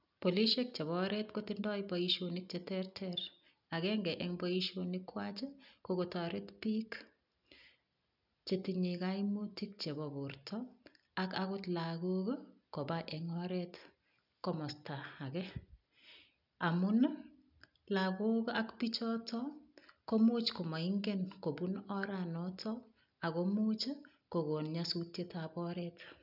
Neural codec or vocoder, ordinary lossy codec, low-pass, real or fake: none; none; 5.4 kHz; real